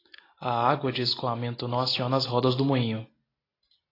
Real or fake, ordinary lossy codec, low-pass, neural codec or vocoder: real; AAC, 24 kbps; 5.4 kHz; none